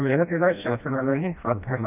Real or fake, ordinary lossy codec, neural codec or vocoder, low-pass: fake; none; codec, 16 kHz, 1 kbps, FreqCodec, smaller model; 3.6 kHz